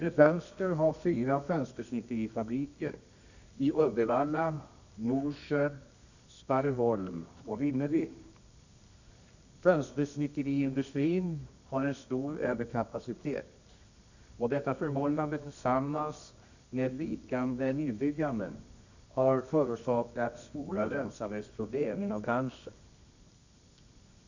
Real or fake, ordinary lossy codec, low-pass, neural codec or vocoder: fake; none; 7.2 kHz; codec, 24 kHz, 0.9 kbps, WavTokenizer, medium music audio release